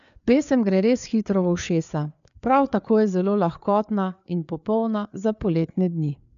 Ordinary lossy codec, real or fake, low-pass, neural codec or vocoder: none; fake; 7.2 kHz; codec, 16 kHz, 4 kbps, FreqCodec, larger model